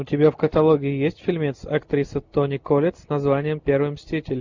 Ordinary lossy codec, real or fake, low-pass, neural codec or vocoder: MP3, 64 kbps; real; 7.2 kHz; none